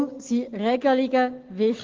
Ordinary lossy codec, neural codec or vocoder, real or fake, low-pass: Opus, 16 kbps; none; real; 7.2 kHz